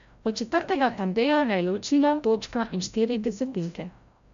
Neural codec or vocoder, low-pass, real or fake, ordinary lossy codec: codec, 16 kHz, 0.5 kbps, FreqCodec, larger model; 7.2 kHz; fake; MP3, 64 kbps